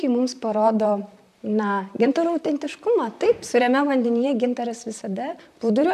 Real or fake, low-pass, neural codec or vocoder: fake; 14.4 kHz; vocoder, 44.1 kHz, 128 mel bands, Pupu-Vocoder